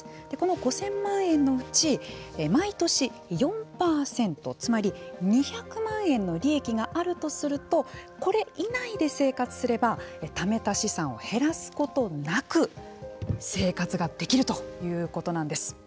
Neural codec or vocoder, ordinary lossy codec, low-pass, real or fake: none; none; none; real